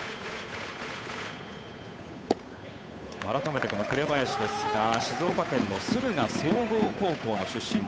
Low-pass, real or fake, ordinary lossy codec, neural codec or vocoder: none; fake; none; codec, 16 kHz, 8 kbps, FunCodec, trained on Chinese and English, 25 frames a second